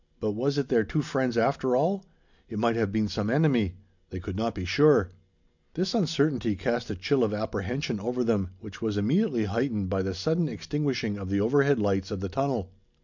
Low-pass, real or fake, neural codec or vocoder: 7.2 kHz; real; none